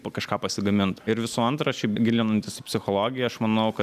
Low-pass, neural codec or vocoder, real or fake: 14.4 kHz; none; real